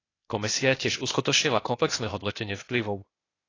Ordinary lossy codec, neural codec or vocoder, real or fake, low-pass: AAC, 32 kbps; codec, 16 kHz, 0.8 kbps, ZipCodec; fake; 7.2 kHz